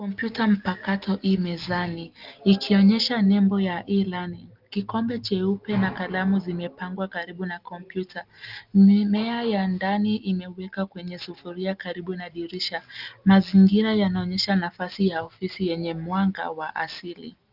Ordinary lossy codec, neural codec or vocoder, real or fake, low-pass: Opus, 24 kbps; none; real; 5.4 kHz